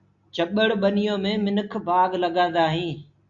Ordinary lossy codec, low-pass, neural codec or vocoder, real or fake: Opus, 64 kbps; 7.2 kHz; none; real